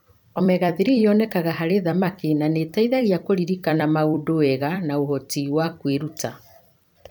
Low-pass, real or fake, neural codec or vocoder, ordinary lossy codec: 19.8 kHz; fake; vocoder, 44.1 kHz, 128 mel bands every 256 samples, BigVGAN v2; none